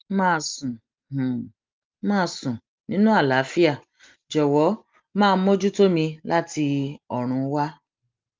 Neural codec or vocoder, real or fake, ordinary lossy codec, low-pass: none; real; Opus, 32 kbps; 7.2 kHz